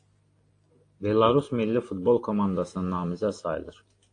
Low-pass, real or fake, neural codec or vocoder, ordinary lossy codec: 9.9 kHz; real; none; AAC, 48 kbps